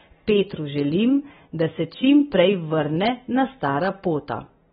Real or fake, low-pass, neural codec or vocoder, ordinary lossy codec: real; 7.2 kHz; none; AAC, 16 kbps